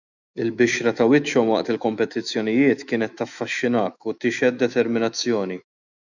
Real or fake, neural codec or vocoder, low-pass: fake; autoencoder, 48 kHz, 128 numbers a frame, DAC-VAE, trained on Japanese speech; 7.2 kHz